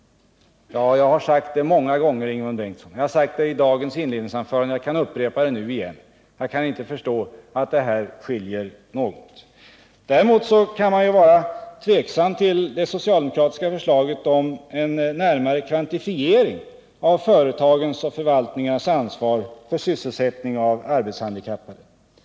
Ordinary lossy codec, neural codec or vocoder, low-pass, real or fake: none; none; none; real